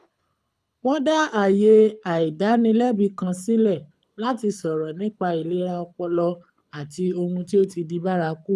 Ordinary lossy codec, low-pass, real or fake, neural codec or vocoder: none; none; fake; codec, 24 kHz, 6 kbps, HILCodec